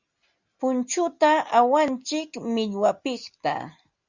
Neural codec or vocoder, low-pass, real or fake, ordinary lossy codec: none; 7.2 kHz; real; Opus, 64 kbps